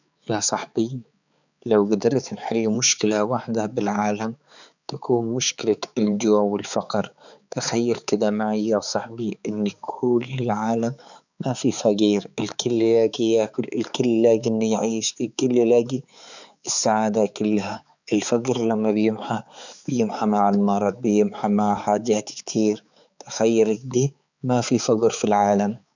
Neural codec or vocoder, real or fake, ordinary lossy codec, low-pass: codec, 16 kHz, 4 kbps, X-Codec, HuBERT features, trained on balanced general audio; fake; none; 7.2 kHz